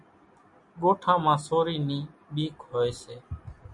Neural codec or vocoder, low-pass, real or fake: none; 10.8 kHz; real